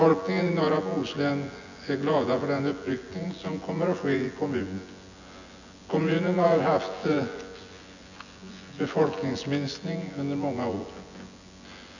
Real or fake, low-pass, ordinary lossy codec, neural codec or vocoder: fake; 7.2 kHz; none; vocoder, 24 kHz, 100 mel bands, Vocos